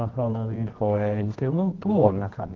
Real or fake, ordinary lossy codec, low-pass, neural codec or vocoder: fake; Opus, 16 kbps; 7.2 kHz; codec, 24 kHz, 0.9 kbps, WavTokenizer, medium music audio release